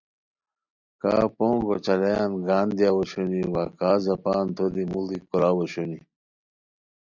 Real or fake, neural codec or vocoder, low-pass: real; none; 7.2 kHz